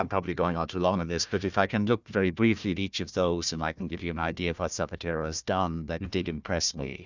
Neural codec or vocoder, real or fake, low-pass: codec, 16 kHz, 1 kbps, FunCodec, trained on Chinese and English, 50 frames a second; fake; 7.2 kHz